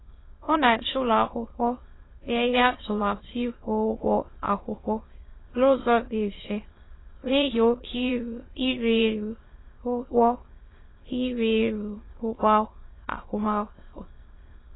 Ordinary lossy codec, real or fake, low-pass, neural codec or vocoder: AAC, 16 kbps; fake; 7.2 kHz; autoencoder, 22.05 kHz, a latent of 192 numbers a frame, VITS, trained on many speakers